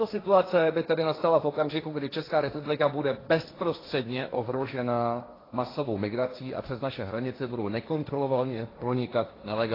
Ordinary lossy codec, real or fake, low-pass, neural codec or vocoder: AAC, 24 kbps; fake; 5.4 kHz; codec, 16 kHz, 1.1 kbps, Voila-Tokenizer